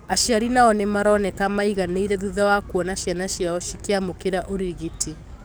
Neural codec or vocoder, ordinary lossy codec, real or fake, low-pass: codec, 44.1 kHz, 7.8 kbps, DAC; none; fake; none